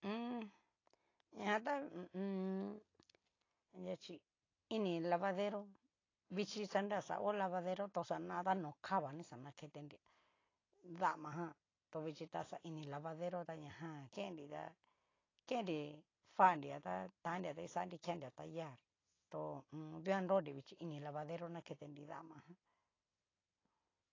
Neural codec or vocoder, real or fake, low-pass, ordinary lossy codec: none; real; 7.2 kHz; AAC, 32 kbps